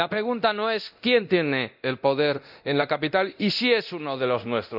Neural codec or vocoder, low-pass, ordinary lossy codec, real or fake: codec, 24 kHz, 0.9 kbps, DualCodec; 5.4 kHz; none; fake